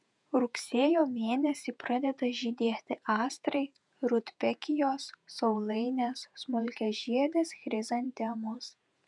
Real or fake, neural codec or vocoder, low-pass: fake; vocoder, 48 kHz, 128 mel bands, Vocos; 10.8 kHz